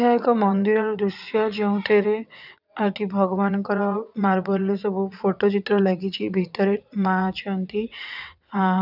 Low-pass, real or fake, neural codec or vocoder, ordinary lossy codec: 5.4 kHz; fake; vocoder, 22.05 kHz, 80 mel bands, WaveNeXt; none